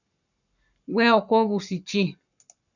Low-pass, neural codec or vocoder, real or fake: 7.2 kHz; codec, 44.1 kHz, 7.8 kbps, Pupu-Codec; fake